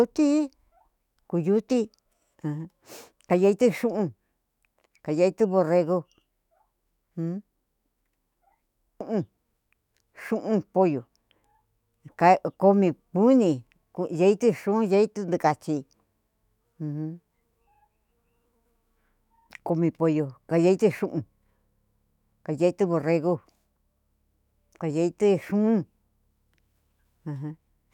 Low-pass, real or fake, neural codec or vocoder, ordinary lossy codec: 19.8 kHz; fake; autoencoder, 48 kHz, 128 numbers a frame, DAC-VAE, trained on Japanese speech; none